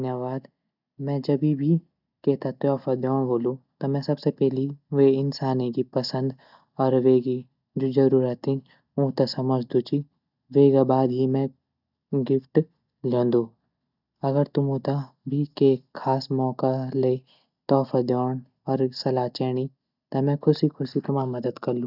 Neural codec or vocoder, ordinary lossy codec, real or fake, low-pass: none; none; real; 5.4 kHz